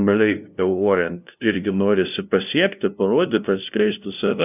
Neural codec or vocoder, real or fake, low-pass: codec, 16 kHz, 0.5 kbps, FunCodec, trained on LibriTTS, 25 frames a second; fake; 3.6 kHz